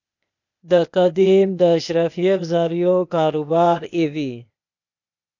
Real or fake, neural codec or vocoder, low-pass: fake; codec, 16 kHz, 0.8 kbps, ZipCodec; 7.2 kHz